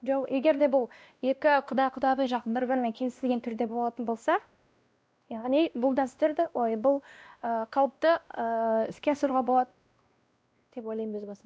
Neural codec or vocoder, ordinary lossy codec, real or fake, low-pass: codec, 16 kHz, 1 kbps, X-Codec, WavLM features, trained on Multilingual LibriSpeech; none; fake; none